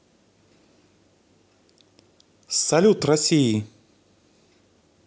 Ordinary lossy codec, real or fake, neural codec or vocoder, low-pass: none; real; none; none